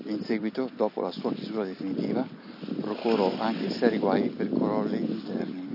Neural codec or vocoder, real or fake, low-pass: none; real; 5.4 kHz